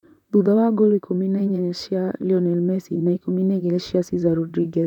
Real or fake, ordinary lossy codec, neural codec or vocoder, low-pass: fake; none; vocoder, 44.1 kHz, 128 mel bands, Pupu-Vocoder; 19.8 kHz